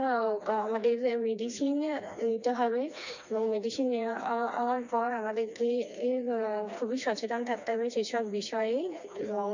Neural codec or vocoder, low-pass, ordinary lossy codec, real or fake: codec, 16 kHz, 2 kbps, FreqCodec, smaller model; 7.2 kHz; none; fake